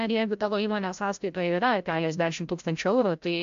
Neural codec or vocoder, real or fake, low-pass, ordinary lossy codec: codec, 16 kHz, 0.5 kbps, FreqCodec, larger model; fake; 7.2 kHz; AAC, 64 kbps